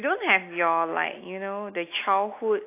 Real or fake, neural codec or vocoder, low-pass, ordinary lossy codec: real; none; 3.6 kHz; AAC, 24 kbps